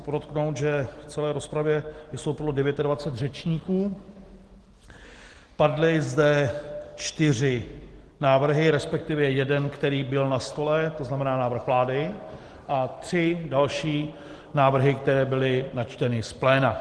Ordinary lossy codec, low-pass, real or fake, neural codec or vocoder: Opus, 16 kbps; 10.8 kHz; real; none